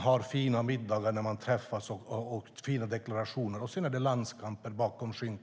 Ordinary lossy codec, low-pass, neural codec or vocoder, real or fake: none; none; none; real